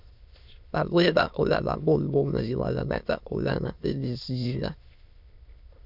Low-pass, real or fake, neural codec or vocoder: 5.4 kHz; fake; autoencoder, 22.05 kHz, a latent of 192 numbers a frame, VITS, trained on many speakers